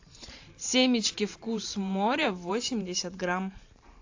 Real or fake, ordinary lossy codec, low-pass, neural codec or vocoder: fake; AAC, 48 kbps; 7.2 kHz; vocoder, 44.1 kHz, 128 mel bands every 512 samples, BigVGAN v2